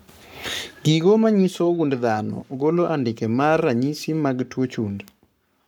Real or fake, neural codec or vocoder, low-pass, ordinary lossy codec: fake; codec, 44.1 kHz, 7.8 kbps, Pupu-Codec; none; none